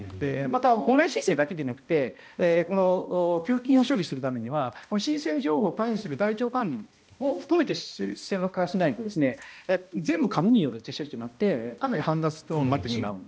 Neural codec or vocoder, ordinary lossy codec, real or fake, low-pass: codec, 16 kHz, 1 kbps, X-Codec, HuBERT features, trained on balanced general audio; none; fake; none